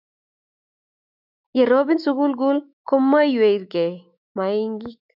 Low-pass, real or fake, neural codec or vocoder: 5.4 kHz; fake; autoencoder, 48 kHz, 128 numbers a frame, DAC-VAE, trained on Japanese speech